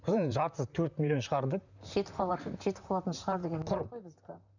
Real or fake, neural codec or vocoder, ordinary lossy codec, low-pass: fake; vocoder, 44.1 kHz, 128 mel bands, Pupu-Vocoder; none; 7.2 kHz